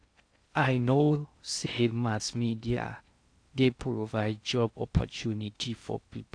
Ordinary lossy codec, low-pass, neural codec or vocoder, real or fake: AAC, 48 kbps; 9.9 kHz; codec, 16 kHz in and 24 kHz out, 0.6 kbps, FocalCodec, streaming, 2048 codes; fake